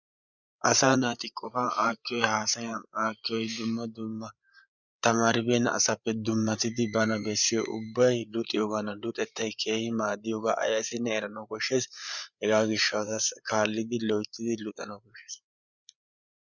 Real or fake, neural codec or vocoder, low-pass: fake; codec, 16 kHz, 8 kbps, FreqCodec, larger model; 7.2 kHz